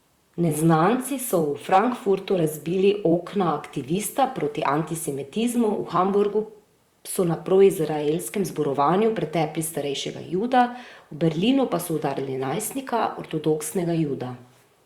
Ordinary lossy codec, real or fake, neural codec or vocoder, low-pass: Opus, 64 kbps; fake; vocoder, 44.1 kHz, 128 mel bands, Pupu-Vocoder; 19.8 kHz